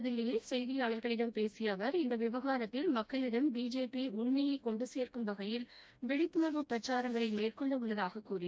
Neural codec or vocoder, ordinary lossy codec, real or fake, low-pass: codec, 16 kHz, 1 kbps, FreqCodec, smaller model; none; fake; none